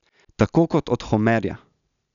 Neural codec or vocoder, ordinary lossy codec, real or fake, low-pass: none; none; real; 7.2 kHz